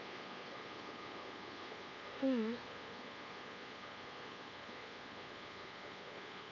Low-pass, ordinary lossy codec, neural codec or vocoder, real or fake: 7.2 kHz; AAC, 48 kbps; codec, 24 kHz, 1.2 kbps, DualCodec; fake